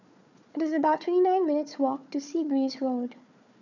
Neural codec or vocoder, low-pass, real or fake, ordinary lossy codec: codec, 16 kHz, 4 kbps, FunCodec, trained on Chinese and English, 50 frames a second; 7.2 kHz; fake; none